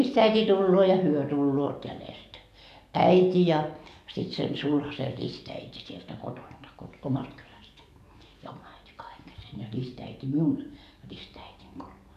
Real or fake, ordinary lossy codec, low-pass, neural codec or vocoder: fake; none; 14.4 kHz; codec, 44.1 kHz, 7.8 kbps, DAC